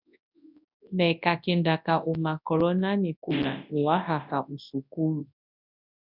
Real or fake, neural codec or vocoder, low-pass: fake; codec, 24 kHz, 0.9 kbps, WavTokenizer, large speech release; 5.4 kHz